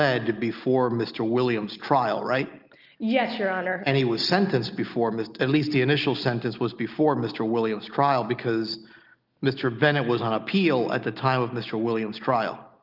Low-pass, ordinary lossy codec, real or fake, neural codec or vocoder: 5.4 kHz; Opus, 24 kbps; real; none